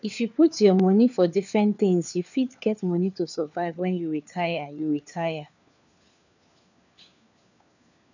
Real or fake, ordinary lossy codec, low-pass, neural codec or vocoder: fake; none; 7.2 kHz; codec, 16 kHz, 4 kbps, FunCodec, trained on LibriTTS, 50 frames a second